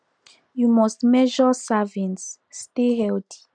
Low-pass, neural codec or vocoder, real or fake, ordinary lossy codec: 9.9 kHz; none; real; none